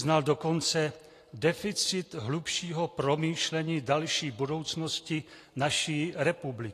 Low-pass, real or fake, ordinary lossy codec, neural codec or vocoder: 14.4 kHz; real; AAC, 48 kbps; none